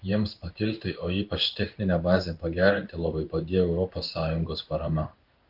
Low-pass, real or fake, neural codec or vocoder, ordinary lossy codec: 5.4 kHz; fake; codec, 16 kHz in and 24 kHz out, 1 kbps, XY-Tokenizer; Opus, 32 kbps